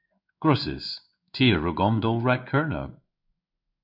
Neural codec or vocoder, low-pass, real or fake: codec, 16 kHz in and 24 kHz out, 1 kbps, XY-Tokenizer; 5.4 kHz; fake